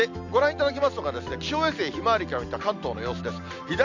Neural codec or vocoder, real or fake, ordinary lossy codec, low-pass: none; real; none; 7.2 kHz